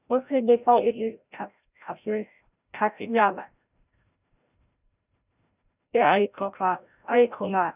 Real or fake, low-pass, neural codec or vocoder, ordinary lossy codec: fake; 3.6 kHz; codec, 16 kHz, 0.5 kbps, FreqCodec, larger model; none